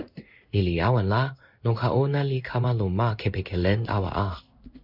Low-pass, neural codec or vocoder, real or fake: 5.4 kHz; codec, 16 kHz in and 24 kHz out, 1 kbps, XY-Tokenizer; fake